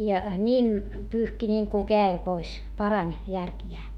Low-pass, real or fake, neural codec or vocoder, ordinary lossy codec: 19.8 kHz; fake; autoencoder, 48 kHz, 32 numbers a frame, DAC-VAE, trained on Japanese speech; none